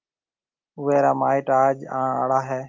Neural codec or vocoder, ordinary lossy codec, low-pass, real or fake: none; Opus, 32 kbps; 7.2 kHz; real